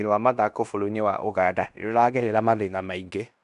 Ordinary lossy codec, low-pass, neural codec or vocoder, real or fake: none; 10.8 kHz; codec, 16 kHz in and 24 kHz out, 0.9 kbps, LongCat-Audio-Codec, fine tuned four codebook decoder; fake